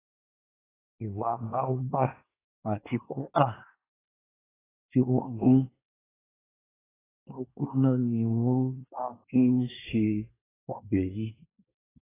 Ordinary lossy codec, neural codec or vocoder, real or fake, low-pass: AAC, 16 kbps; codec, 24 kHz, 1 kbps, SNAC; fake; 3.6 kHz